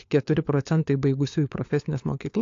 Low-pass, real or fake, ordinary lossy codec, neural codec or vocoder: 7.2 kHz; fake; AAC, 48 kbps; codec, 16 kHz, 4 kbps, FunCodec, trained on LibriTTS, 50 frames a second